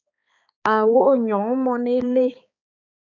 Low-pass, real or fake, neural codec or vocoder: 7.2 kHz; fake; codec, 16 kHz, 4 kbps, X-Codec, HuBERT features, trained on balanced general audio